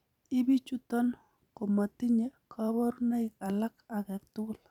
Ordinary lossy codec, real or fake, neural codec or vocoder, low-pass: none; fake; vocoder, 44.1 kHz, 128 mel bands every 512 samples, BigVGAN v2; 19.8 kHz